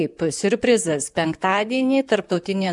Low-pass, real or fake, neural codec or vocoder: 10.8 kHz; fake; vocoder, 44.1 kHz, 128 mel bands, Pupu-Vocoder